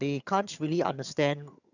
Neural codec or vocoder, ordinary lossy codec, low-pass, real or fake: vocoder, 22.05 kHz, 80 mel bands, HiFi-GAN; none; 7.2 kHz; fake